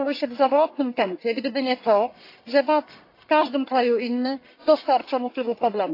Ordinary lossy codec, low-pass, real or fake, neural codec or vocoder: AAC, 32 kbps; 5.4 kHz; fake; codec, 44.1 kHz, 1.7 kbps, Pupu-Codec